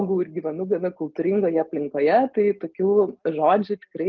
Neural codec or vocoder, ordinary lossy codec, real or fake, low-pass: none; Opus, 32 kbps; real; 7.2 kHz